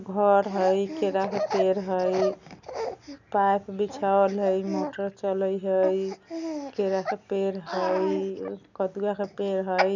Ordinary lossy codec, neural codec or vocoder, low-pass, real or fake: none; none; 7.2 kHz; real